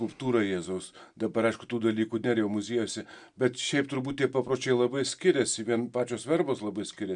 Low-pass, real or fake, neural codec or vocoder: 9.9 kHz; real; none